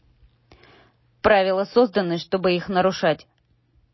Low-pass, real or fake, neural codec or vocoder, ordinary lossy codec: 7.2 kHz; real; none; MP3, 24 kbps